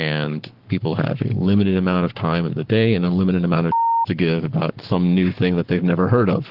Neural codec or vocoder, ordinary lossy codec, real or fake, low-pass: codec, 44.1 kHz, 7.8 kbps, Pupu-Codec; Opus, 16 kbps; fake; 5.4 kHz